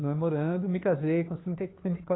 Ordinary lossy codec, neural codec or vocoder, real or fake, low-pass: AAC, 16 kbps; codec, 16 kHz, 2 kbps, FunCodec, trained on Chinese and English, 25 frames a second; fake; 7.2 kHz